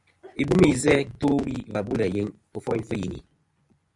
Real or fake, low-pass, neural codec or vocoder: fake; 10.8 kHz; vocoder, 24 kHz, 100 mel bands, Vocos